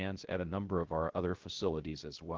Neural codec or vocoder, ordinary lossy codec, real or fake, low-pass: codec, 16 kHz, 0.3 kbps, FocalCodec; Opus, 16 kbps; fake; 7.2 kHz